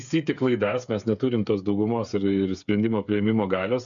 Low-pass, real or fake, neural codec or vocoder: 7.2 kHz; fake; codec, 16 kHz, 8 kbps, FreqCodec, smaller model